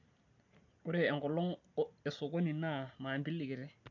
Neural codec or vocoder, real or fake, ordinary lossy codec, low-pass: none; real; none; 7.2 kHz